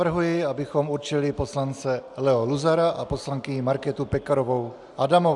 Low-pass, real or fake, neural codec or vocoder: 10.8 kHz; real; none